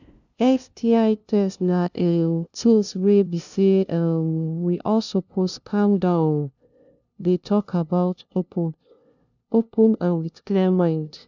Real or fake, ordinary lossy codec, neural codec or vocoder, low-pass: fake; none; codec, 16 kHz, 0.5 kbps, FunCodec, trained on LibriTTS, 25 frames a second; 7.2 kHz